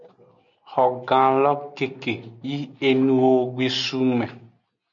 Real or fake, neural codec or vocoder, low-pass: real; none; 7.2 kHz